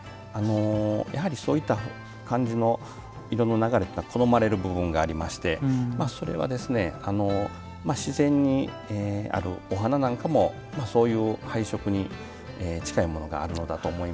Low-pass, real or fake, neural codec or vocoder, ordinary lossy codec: none; real; none; none